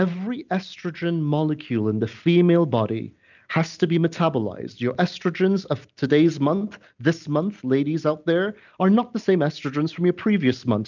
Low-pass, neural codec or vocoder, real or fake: 7.2 kHz; none; real